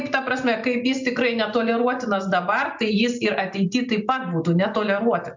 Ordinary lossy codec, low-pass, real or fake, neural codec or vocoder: MP3, 64 kbps; 7.2 kHz; real; none